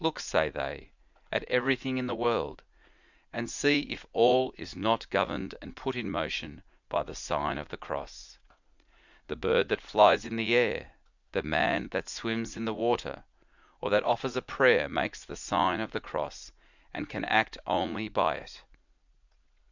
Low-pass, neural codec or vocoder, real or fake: 7.2 kHz; vocoder, 44.1 kHz, 80 mel bands, Vocos; fake